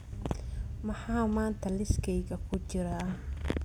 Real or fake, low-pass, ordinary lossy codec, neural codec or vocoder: real; 19.8 kHz; none; none